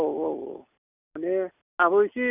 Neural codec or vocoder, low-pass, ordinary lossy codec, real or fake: none; 3.6 kHz; none; real